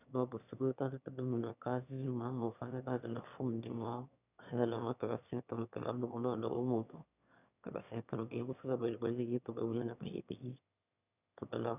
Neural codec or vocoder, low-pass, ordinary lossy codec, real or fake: autoencoder, 22.05 kHz, a latent of 192 numbers a frame, VITS, trained on one speaker; 3.6 kHz; none; fake